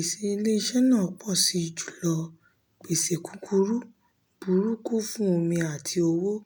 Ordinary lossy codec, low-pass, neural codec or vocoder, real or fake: none; none; none; real